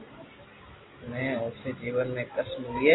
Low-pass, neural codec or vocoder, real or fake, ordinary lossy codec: 7.2 kHz; none; real; AAC, 16 kbps